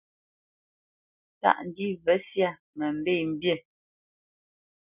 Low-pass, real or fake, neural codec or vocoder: 3.6 kHz; real; none